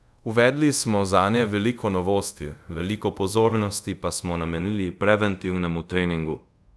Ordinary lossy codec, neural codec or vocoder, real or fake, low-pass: none; codec, 24 kHz, 0.5 kbps, DualCodec; fake; none